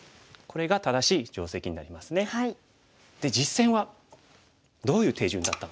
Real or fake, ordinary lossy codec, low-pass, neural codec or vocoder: real; none; none; none